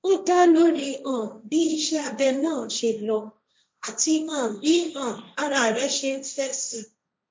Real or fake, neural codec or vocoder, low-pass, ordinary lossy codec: fake; codec, 16 kHz, 1.1 kbps, Voila-Tokenizer; none; none